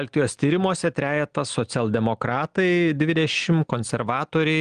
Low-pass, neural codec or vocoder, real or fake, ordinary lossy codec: 9.9 kHz; none; real; Opus, 24 kbps